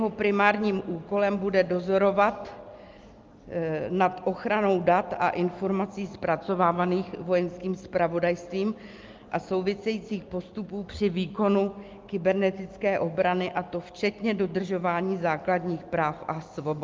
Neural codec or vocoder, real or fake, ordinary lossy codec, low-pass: none; real; Opus, 32 kbps; 7.2 kHz